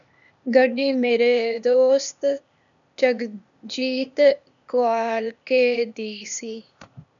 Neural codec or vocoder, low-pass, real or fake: codec, 16 kHz, 0.8 kbps, ZipCodec; 7.2 kHz; fake